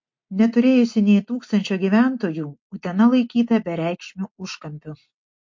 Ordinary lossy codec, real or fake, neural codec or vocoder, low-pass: MP3, 48 kbps; real; none; 7.2 kHz